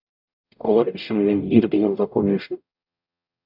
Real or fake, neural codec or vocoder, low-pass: fake; codec, 44.1 kHz, 0.9 kbps, DAC; 5.4 kHz